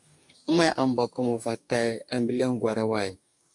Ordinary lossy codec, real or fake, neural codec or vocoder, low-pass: AAC, 64 kbps; fake; codec, 44.1 kHz, 2.6 kbps, DAC; 10.8 kHz